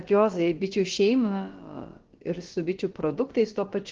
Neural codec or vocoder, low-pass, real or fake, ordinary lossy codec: codec, 16 kHz, about 1 kbps, DyCAST, with the encoder's durations; 7.2 kHz; fake; Opus, 16 kbps